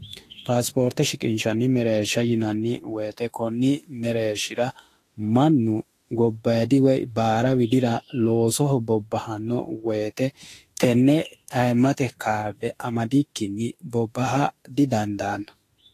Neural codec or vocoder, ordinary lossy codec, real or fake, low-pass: autoencoder, 48 kHz, 32 numbers a frame, DAC-VAE, trained on Japanese speech; AAC, 48 kbps; fake; 14.4 kHz